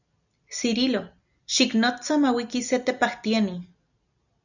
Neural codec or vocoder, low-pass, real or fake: none; 7.2 kHz; real